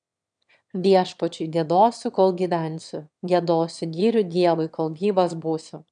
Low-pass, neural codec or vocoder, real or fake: 9.9 kHz; autoencoder, 22.05 kHz, a latent of 192 numbers a frame, VITS, trained on one speaker; fake